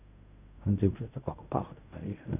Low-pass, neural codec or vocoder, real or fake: 3.6 kHz; codec, 16 kHz in and 24 kHz out, 0.4 kbps, LongCat-Audio-Codec, fine tuned four codebook decoder; fake